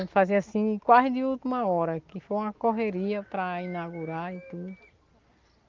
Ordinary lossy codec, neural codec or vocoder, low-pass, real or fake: Opus, 32 kbps; none; 7.2 kHz; real